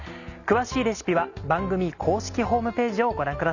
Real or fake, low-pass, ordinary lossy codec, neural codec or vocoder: real; 7.2 kHz; none; none